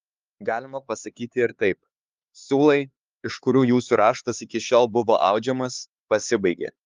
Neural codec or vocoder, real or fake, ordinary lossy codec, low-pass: codec, 16 kHz, 4 kbps, X-Codec, HuBERT features, trained on LibriSpeech; fake; Opus, 32 kbps; 7.2 kHz